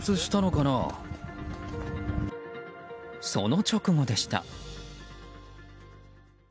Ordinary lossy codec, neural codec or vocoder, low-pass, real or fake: none; none; none; real